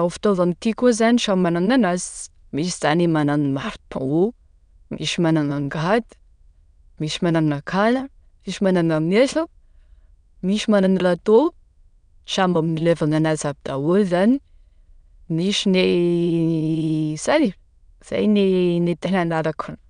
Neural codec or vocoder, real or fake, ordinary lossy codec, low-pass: autoencoder, 22.05 kHz, a latent of 192 numbers a frame, VITS, trained on many speakers; fake; none; 9.9 kHz